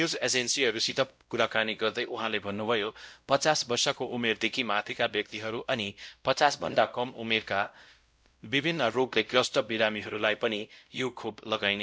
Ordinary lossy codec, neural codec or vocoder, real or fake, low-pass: none; codec, 16 kHz, 0.5 kbps, X-Codec, WavLM features, trained on Multilingual LibriSpeech; fake; none